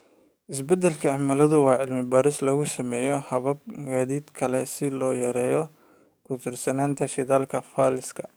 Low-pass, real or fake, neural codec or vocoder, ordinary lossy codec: none; fake; codec, 44.1 kHz, 7.8 kbps, DAC; none